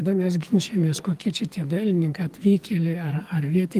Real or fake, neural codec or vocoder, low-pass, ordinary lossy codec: fake; codec, 44.1 kHz, 2.6 kbps, SNAC; 14.4 kHz; Opus, 32 kbps